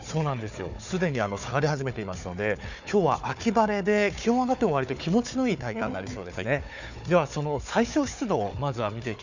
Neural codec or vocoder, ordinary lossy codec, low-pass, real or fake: codec, 16 kHz, 4 kbps, FunCodec, trained on Chinese and English, 50 frames a second; none; 7.2 kHz; fake